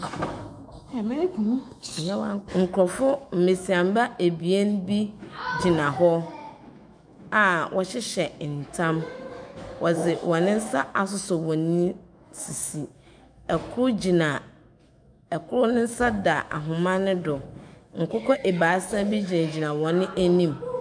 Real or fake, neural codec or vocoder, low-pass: fake; autoencoder, 48 kHz, 128 numbers a frame, DAC-VAE, trained on Japanese speech; 9.9 kHz